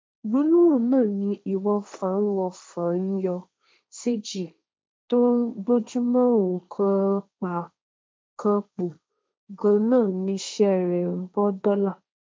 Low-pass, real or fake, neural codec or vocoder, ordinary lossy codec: none; fake; codec, 16 kHz, 1.1 kbps, Voila-Tokenizer; none